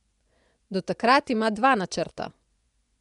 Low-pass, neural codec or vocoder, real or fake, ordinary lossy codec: 10.8 kHz; vocoder, 24 kHz, 100 mel bands, Vocos; fake; none